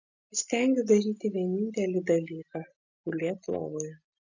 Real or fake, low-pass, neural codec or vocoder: real; 7.2 kHz; none